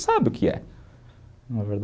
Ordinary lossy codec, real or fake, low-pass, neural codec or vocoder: none; real; none; none